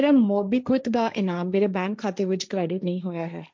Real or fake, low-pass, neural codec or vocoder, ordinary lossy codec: fake; none; codec, 16 kHz, 1.1 kbps, Voila-Tokenizer; none